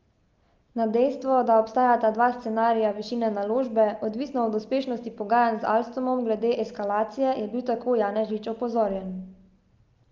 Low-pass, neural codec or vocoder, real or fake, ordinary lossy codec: 7.2 kHz; none; real; Opus, 24 kbps